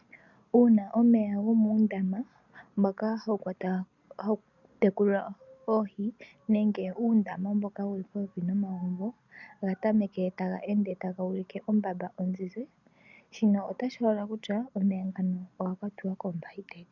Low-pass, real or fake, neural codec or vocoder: 7.2 kHz; real; none